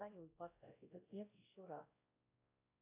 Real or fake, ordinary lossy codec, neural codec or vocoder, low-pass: fake; AAC, 24 kbps; codec, 16 kHz, 1 kbps, X-Codec, WavLM features, trained on Multilingual LibriSpeech; 3.6 kHz